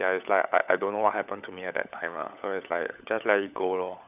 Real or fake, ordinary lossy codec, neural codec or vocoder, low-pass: fake; none; codec, 16 kHz, 16 kbps, FunCodec, trained on LibriTTS, 50 frames a second; 3.6 kHz